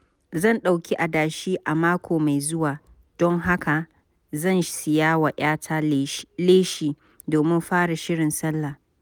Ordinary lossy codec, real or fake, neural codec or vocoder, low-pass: none; real; none; none